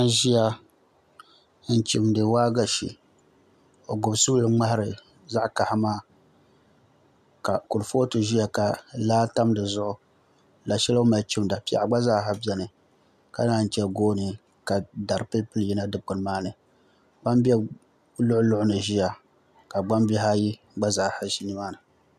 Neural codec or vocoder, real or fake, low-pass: none; real; 14.4 kHz